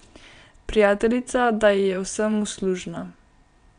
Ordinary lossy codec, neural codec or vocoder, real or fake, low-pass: none; vocoder, 22.05 kHz, 80 mel bands, WaveNeXt; fake; 9.9 kHz